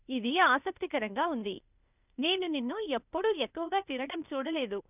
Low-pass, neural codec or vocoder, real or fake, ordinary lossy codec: 3.6 kHz; codec, 16 kHz, 0.8 kbps, ZipCodec; fake; none